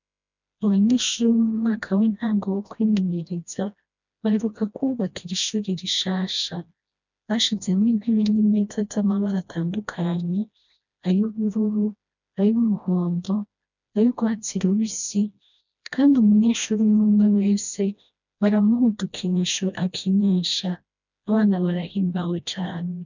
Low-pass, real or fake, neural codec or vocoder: 7.2 kHz; fake; codec, 16 kHz, 1 kbps, FreqCodec, smaller model